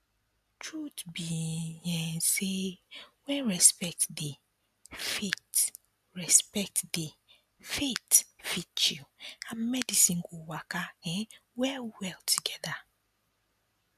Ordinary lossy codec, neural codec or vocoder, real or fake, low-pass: MP3, 96 kbps; none; real; 14.4 kHz